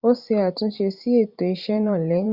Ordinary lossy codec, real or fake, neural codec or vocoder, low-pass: Opus, 64 kbps; fake; vocoder, 44.1 kHz, 128 mel bands every 512 samples, BigVGAN v2; 5.4 kHz